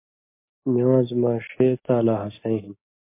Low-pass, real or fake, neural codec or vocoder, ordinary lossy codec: 3.6 kHz; real; none; MP3, 24 kbps